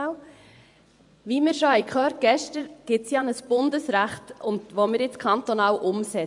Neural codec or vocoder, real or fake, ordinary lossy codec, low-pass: vocoder, 24 kHz, 100 mel bands, Vocos; fake; none; 10.8 kHz